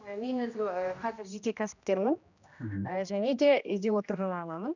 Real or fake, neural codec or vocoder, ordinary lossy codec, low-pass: fake; codec, 16 kHz, 1 kbps, X-Codec, HuBERT features, trained on general audio; none; 7.2 kHz